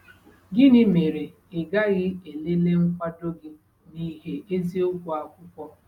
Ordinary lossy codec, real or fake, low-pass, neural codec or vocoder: none; real; 19.8 kHz; none